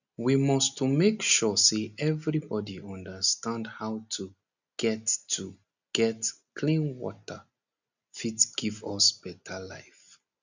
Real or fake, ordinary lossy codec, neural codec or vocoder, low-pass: real; none; none; 7.2 kHz